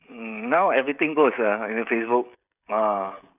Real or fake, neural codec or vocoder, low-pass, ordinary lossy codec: fake; codec, 16 kHz, 16 kbps, FreqCodec, smaller model; 3.6 kHz; none